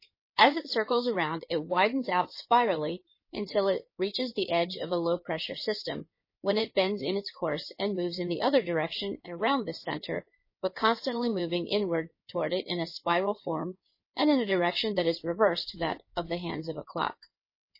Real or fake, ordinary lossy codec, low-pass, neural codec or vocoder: fake; MP3, 24 kbps; 5.4 kHz; codec, 16 kHz in and 24 kHz out, 2.2 kbps, FireRedTTS-2 codec